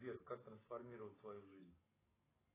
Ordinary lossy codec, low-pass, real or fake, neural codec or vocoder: AAC, 16 kbps; 3.6 kHz; real; none